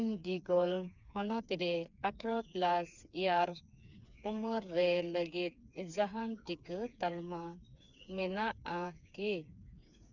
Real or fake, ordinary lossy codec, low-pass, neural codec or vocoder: fake; none; 7.2 kHz; codec, 16 kHz, 4 kbps, FreqCodec, smaller model